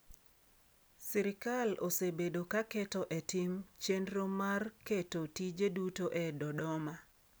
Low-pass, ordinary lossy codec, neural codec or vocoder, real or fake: none; none; none; real